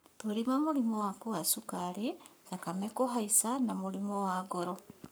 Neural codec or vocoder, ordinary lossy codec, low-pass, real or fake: codec, 44.1 kHz, 7.8 kbps, Pupu-Codec; none; none; fake